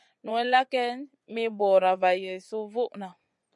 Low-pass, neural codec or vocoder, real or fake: 10.8 kHz; vocoder, 24 kHz, 100 mel bands, Vocos; fake